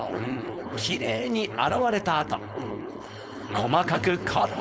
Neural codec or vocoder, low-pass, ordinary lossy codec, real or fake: codec, 16 kHz, 4.8 kbps, FACodec; none; none; fake